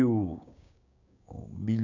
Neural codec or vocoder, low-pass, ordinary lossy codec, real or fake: codec, 16 kHz, 6 kbps, DAC; 7.2 kHz; none; fake